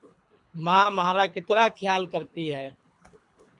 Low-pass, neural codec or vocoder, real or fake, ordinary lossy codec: 10.8 kHz; codec, 24 kHz, 3 kbps, HILCodec; fake; MP3, 64 kbps